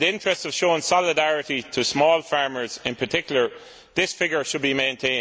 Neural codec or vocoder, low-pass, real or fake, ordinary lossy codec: none; none; real; none